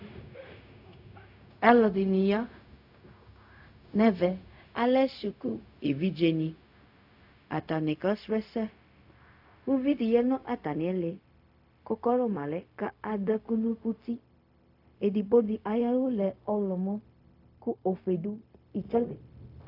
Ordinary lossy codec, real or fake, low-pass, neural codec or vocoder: AAC, 48 kbps; fake; 5.4 kHz; codec, 16 kHz, 0.4 kbps, LongCat-Audio-Codec